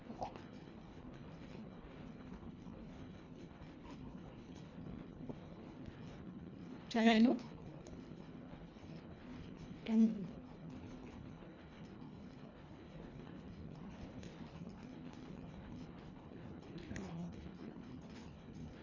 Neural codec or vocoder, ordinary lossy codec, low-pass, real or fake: codec, 24 kHz, 1.5 kbps, HILCodec; none; 7.2 kHz; fake